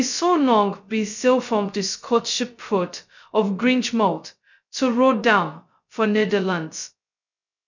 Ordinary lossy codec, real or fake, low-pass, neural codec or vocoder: none; fake; 7.2 kHz; codec, 16 kHz, 0.2 kbps, FocalCodec